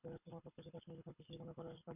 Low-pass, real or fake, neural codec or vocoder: 3.6 kHz; fake; codec, 24 kHz, 6 kbps, HILCodec